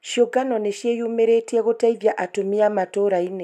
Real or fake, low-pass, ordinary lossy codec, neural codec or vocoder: real; 14.4 kHz; none; none